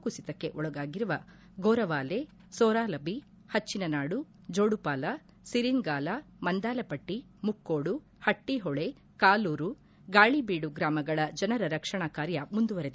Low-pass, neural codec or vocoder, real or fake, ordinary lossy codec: none; none; real; none